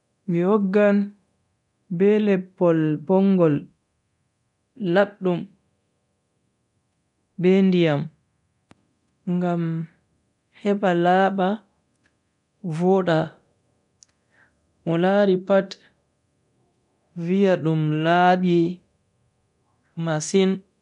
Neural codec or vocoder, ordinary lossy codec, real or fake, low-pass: codec, 24 kHz, 0.9 kbps, DualCodec; none; fake; 10.8 kHz